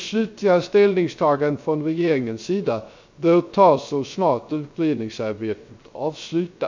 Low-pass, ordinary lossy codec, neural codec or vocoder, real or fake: 7.2 kHz; MP3, 64 kbps; codec, 16 kHz, 0.3 kbps, FocalCodec; fake